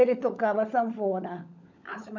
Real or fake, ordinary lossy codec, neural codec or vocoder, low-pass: fake; none; codec, 16 kHz, 16 kbps, FunCodec, trained on LibriTTS, 50 frames a second; 7.2 kHz